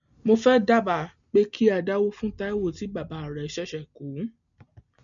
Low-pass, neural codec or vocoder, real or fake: 7.2 kHz; none; real